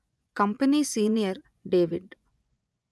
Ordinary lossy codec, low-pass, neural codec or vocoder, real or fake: none; none; vocoder, 24 kHz, 100 mel bands, Vocos; fake